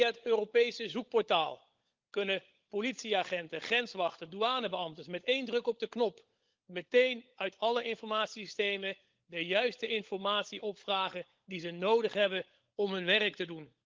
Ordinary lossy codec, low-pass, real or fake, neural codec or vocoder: Opus, 32 kbps; 7.2 kHz; fake; codec, 16 kHz, 16 kbps, FunCodec, trained on LibriTTS, 50 frames a second